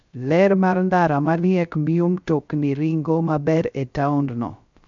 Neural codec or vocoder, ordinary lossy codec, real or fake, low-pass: codec, 16 kHz, 0.3 kbps, FocalCodec; MP3, 64 kbps; fake; 7.2 kHz